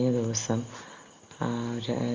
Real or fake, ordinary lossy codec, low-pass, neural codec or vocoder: real; Opus, 32 kbps; 7.2 kHz; none